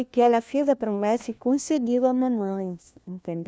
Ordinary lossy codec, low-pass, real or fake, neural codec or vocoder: none; none; fake; codec, 16 kHz, 1 kbps, FunCodec, trained on LibriTTS, 50 frames a second